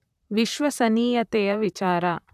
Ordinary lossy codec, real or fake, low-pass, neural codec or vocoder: none; fake; 14.4 kHz; vocoder, 44.1 kHz, 128 mel bands, Pupu-Vocoder